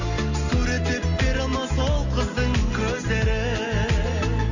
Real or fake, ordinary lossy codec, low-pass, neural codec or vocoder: real; none; 7.2 kHz; none